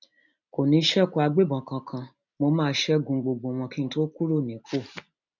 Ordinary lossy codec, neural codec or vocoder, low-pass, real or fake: none; none; 7.2 kHz; real